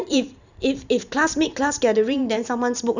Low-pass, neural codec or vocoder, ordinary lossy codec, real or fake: 7.2 kHz; vocoder, 44.1 kHz, 128 mel bands every 512 samples, BigVGAN v2; none; fake